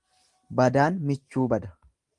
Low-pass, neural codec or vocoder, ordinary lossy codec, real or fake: 10.8 kHz; none; Opus, 24 kbps; real